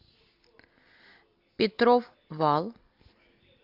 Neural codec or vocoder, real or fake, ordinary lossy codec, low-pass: none; real; AAC, 48 kbps; 5.4 kHz